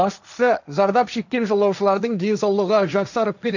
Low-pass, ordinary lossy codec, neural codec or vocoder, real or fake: 7.2 kHz; none; codec, 16 kHz, 1.1 kbps, Voila-Tokenizer; fake